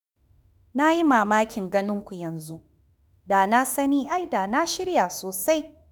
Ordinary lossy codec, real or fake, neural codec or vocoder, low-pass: none; fake; autoencoder, 48 kHz, 32 numbers a frame, DAC-VAE, trained on Japanese speech; none